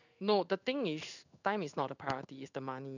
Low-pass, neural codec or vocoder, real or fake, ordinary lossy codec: 7.2 kHz; codec, 16 kHz in and 24 kHz out, 1 kbps, XY-Tokenizer; fake; none